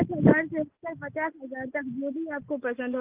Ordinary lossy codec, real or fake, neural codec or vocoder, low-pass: Opus, 32 kbps; real; none; 3.6 kHz